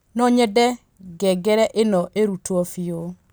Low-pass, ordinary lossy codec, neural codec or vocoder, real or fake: none; none; none; real